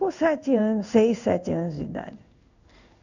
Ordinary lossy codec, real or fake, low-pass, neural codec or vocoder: none; fake; 7.2 kHz; codec, 16 kHz in and 24 kHz out, 1 kbps, XY-Tokenizer